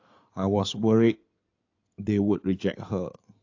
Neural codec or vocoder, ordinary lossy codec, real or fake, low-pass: codec, 16 kHz in and 24 kHz out, 2.2 kbps, FireRedTTS-2 codec; AAC, 48 kbps; fake; 7.2 kHz